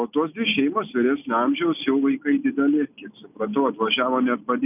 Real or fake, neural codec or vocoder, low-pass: real; none; 3.6 kHz